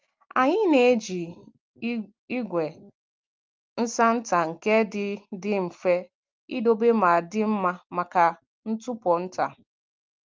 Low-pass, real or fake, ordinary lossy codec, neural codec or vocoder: 7.2 kHz; real; Opus, 32 kbps; none